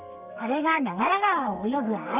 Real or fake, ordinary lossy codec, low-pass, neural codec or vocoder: fake; none; 3.6 kHz; codec, 32 kHz, 1.9 kbps, SNAC